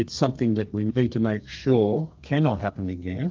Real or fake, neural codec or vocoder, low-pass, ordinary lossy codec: fake; codec, 32 kHz, 1.9 kbps, SNAC; 7.2 kHz; Opus, 24 kbps